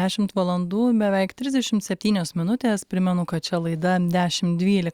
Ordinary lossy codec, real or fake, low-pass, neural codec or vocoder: Opus, 64 kbps; real; 19.8 kHz; none